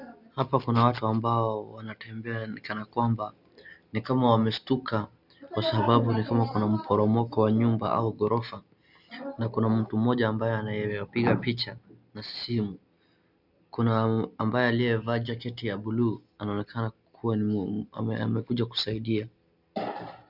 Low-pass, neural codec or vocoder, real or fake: 5.4 kHz; none; real